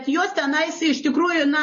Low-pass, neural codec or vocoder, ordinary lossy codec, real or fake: 7.2 kHz; none; MP3, 32 kbps; real